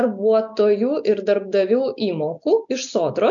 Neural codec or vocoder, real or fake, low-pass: none; real; 7.2 kHz